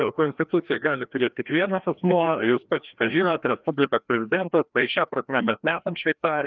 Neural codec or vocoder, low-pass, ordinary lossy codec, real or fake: codec, 16 kHz, 1 kbps, FreqCodec, larger model; 7.2 kHz; Opus, 24 kbps; fake